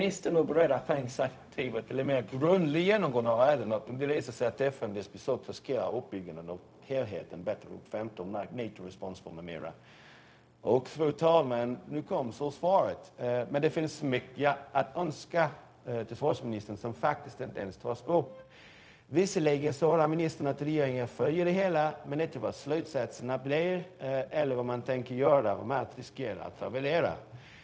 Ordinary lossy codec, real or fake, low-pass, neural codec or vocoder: none; fake; none; codec, 16 kHz, 0.4 kbps, LongCat-Audio-Codec